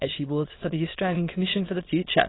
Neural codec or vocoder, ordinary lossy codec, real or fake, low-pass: autoencoder, 22.05 kHz, a latent of 192 numbers a frame, VITS, trained on many speakers; AAC, 16 kbps; fake; 7.2 kHz